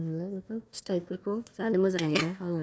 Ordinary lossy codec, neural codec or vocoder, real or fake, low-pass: none; codec, 16 kHz, 1 kbps, FunCodec, trained on Chinese and English, 50 frames a second; fake; none